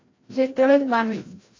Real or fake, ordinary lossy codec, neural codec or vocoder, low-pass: fake; AAC, 32 kbps; codec, 16 kHz, 0.5 kbps, FreqCodec, larger model; 7.2 kHz